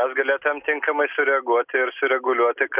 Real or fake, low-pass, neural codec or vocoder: real; 3.6 kHz; none